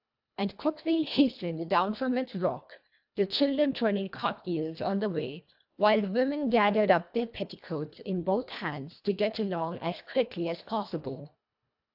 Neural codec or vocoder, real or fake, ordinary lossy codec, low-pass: codec, 24 kHz, 1.5 kbps, HILCodec; fake; AAC, 48 kbps; 5.4 kHz